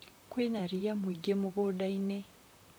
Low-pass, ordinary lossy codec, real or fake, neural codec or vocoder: none; none; fake; vocoder, 44.1 kHz, 128 mel bands, Pupu-Vocoder